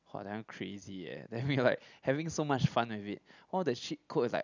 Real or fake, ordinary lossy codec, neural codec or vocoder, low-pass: real; none; none; 7.2 kHz